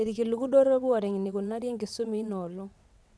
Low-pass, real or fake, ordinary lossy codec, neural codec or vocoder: none; fake; none; vocoder, 22.05 kHz, 80 mel bands, WaveNeXt